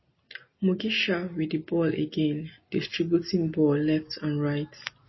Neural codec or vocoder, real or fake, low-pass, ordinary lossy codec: none; real; 7.2 kHz; MP3, 24 kbps